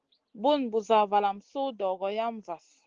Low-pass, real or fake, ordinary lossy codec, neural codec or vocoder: 7.2 kHz; real; Opus, 16 kbps; none